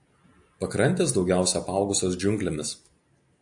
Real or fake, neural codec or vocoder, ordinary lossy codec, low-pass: real; none; AAC, 64 kbps; 10.8 kHz